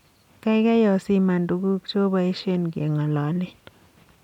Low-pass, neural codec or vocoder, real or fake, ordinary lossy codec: 19.8 kHz; none; real; none